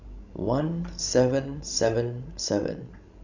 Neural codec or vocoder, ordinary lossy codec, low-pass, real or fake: codec, 16 kHz, 16 kbps, FreqCodec, larger model; none; 7.2 kHz; fake